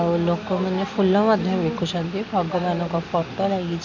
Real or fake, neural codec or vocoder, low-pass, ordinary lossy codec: real; none; 7.2 kHz; none